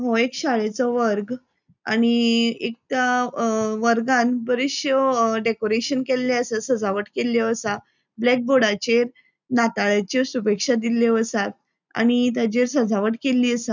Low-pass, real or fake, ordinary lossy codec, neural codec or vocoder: 7.2 kHz; real; none; none